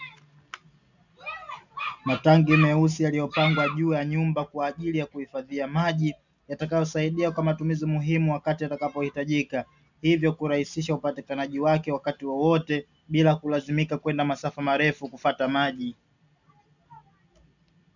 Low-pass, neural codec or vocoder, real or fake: 7.2 kHz; none; real